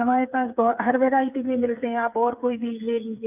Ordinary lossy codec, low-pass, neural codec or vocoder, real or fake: none; 3.6 kHz; codec, 16 kHz, 8 kbps, FreqCodec, smaller model; fake